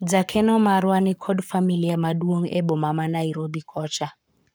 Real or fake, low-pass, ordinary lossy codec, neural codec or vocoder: fake; none; none; codec, 44.1 kHz, 7.8 kbps, Pupu-Codec